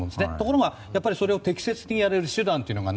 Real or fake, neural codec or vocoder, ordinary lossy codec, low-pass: real; none; none; none